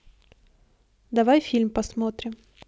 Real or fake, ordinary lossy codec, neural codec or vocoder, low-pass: real; none; none; none